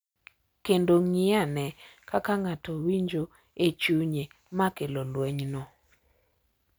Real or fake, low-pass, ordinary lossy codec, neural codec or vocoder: real; none; none; none